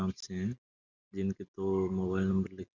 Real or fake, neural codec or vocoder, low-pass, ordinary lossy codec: real; none; 7.2 kHz; none